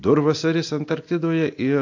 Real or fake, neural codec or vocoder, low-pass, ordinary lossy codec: real; none; 7.2 kHz; AAC, 48 kbps